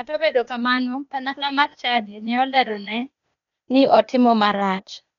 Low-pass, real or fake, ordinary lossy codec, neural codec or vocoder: 7.2 kHz; fake; none; codec, 16 kHz, 0.8 kbps, ZipCodec